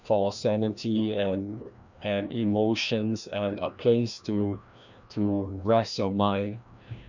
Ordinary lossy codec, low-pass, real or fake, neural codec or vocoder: none; 7.2 kHz; fake; codec, 16 kHz, 1 kbps, FreqCodec, larger model